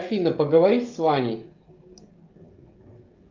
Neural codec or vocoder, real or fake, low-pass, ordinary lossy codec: codec, 16 kHz, 16 kbps, FreqCodec, smaller model; fake; 7.2 kHz; Opus, 32 kbps